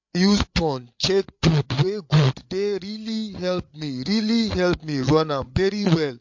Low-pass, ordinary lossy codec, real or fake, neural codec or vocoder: 7.2 kHz; MP3, 48 kbps; fake; codec, 16 kHz, 8 kbps, FreqCodec, larger model